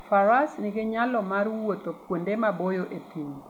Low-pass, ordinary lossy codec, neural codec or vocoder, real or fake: 19.8 kHz; MP3, 96 kbps; none; real